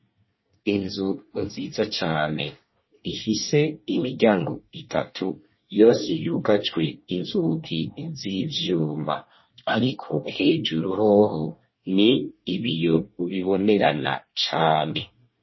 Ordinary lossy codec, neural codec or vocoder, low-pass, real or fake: MP3, 24 kbps; codec, 24 kHz, 1 kbps, SNAC; 7.2 kHz; fake